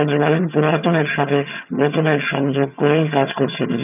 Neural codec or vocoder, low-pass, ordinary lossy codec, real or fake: vocoder, 22.05 kHz, 80 mel bands, HiFi-GAN; 3.6 kHz; none; fake